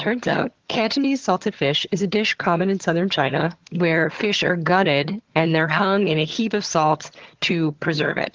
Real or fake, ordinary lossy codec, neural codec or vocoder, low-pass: fake; Opus, 16 kbps; vocoder, 22.05 kHz, 80 mel bands, HiFi-GAN; 7.2 kHz